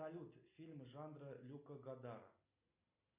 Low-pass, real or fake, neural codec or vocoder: 3.6 kHz; real; none